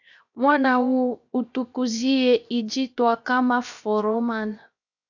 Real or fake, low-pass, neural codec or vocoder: fake; 7.2 kHz; codec, 16 kHz, 0.7 kbps, FocalCodec